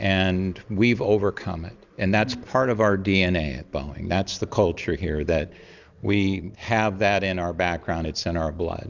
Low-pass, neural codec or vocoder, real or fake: 7.2 kHz; none; real